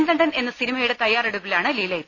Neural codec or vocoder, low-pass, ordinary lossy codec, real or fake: none; 7.2 kHz; Opus, 64 kbps; real